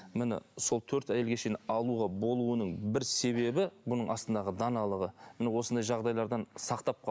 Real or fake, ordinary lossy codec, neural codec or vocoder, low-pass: real; none; none; none